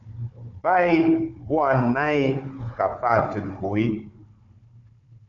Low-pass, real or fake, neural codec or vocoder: 7.2 kHz; fake; codec, 16 kHz, 4 kbps, FunCodec, trained on Chinese and English, 50 frames a second